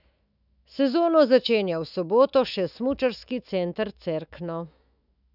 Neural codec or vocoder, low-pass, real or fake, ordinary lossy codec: autoencoder, 48 kHz, 128 numbers a frame, DAC-VAE, trained on Japanese speech; 5.4 kHz; fake; none